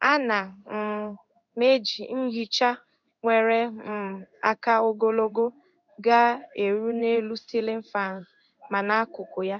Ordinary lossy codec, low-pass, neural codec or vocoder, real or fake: none; 7.2 kHz; codec, 16 kHz in and 24 kHz out, 1 kbps, XY-Tokenizer; fake